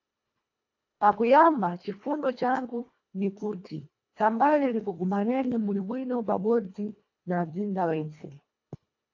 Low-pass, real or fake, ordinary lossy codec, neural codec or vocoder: 7.2 kHz; fake; AAC, 48 kbps; codec, 24 kHz, 1.5 kbps, HILCodec